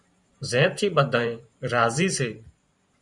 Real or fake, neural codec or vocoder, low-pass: fake; vocoder, 44.1 kHz, 128 mel bands every 512 samples, BigVGAN v2; 10.8 kHz